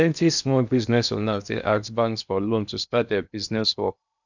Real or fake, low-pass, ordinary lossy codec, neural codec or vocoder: fake; 7.2 kHz; none; codec, 16 kHz in and 24 kHz out, 0.6 kbps, FocalCodec, streaming, 2048 codes